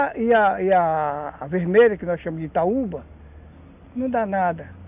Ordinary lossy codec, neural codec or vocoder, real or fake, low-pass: none; none; real; 3.6 kHz